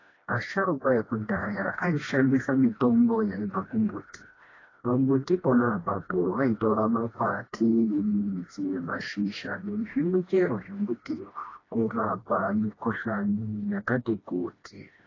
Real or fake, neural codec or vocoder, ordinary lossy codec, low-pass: fake; codec, 16 kHz, 1 kbps, FreqCodec, smaller model; AAC, 32 kbps; 7.2 kHz